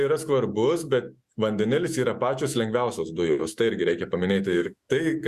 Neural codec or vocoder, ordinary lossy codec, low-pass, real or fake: autoencoder, 48 kHz, 128 numbers a frame, DAC-VAE, trained on Japanese speech; Opus, 64 kbps; 14.4 kHz; fake